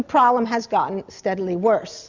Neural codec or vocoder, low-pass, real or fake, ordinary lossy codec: none; 7.2 kHz; real; Opus, 64 kbps